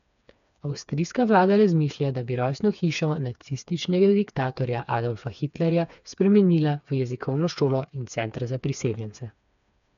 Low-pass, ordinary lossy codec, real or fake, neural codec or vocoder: 7.2 kHz; none; fake; codec, 16 kHz, 4 kbps, FreqCodec, smaller model